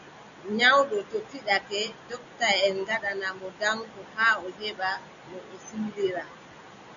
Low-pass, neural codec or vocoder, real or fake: 7.2 kHz; none; real